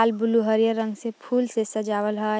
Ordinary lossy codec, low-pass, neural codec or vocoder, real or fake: none; none; none; real